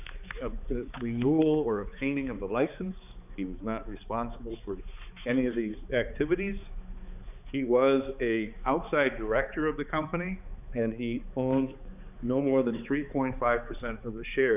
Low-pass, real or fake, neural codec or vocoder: 3.6 kHz; fake; codec, 16 kHz, 4 kbps, X-Codec, HuBERT features, trained on balanced general audio